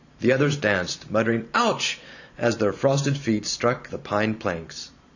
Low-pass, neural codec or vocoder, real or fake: 7.2 kHz; vocoder, 44.1 kHz, 128 mel bands every 512 samples, BigVGAN v2; fake